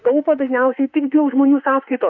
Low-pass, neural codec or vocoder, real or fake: 7.2 kHz; autoencoder, 48 kHz, 32 numbers a frame, DAC-VAE, trained on Japanese speech; fake